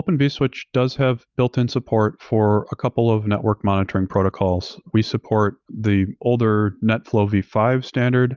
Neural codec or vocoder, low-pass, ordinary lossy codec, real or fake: none; 7.2 kHz; Opus, 24 kbps; real